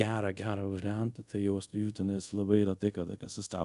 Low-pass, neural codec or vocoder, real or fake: 10.8 kHz; codec, 24 kHz, 0.5 kbps, DualCodec; fake